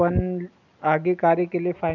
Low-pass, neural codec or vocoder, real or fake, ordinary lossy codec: 7.2 kHz; none; real; none